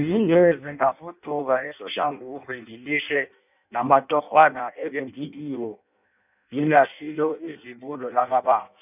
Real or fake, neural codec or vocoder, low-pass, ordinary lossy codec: fake; codec, 16 kHz in and 24 kHz out, 0.6 kbps, FireRedTTS-2 codec; 3.6 kHz; none